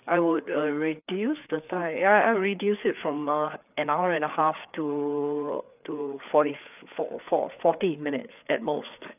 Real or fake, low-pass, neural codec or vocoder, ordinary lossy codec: fake; 3.6 kHz; codec, 16 kHz, 4 kbps, FreqCodec, larger model; none